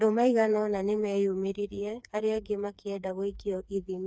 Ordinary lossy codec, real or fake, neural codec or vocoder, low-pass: none; fake; codec, 16 kHz, 4 kbps, FreqCodec, smaller model; none